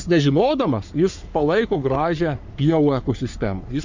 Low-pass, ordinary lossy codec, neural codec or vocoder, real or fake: 7.2 kHz; MP3, 64 kbps; codec, 44.1 kHz, 3.4 kbps, Pupu-Codec; fake